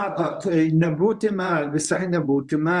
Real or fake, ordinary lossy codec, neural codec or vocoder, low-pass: fake; Opus, 64 kbps; codec, 24 kHz, 0.9 kbps, WavTokenizer, medium speech release version 1; 10.8 kHz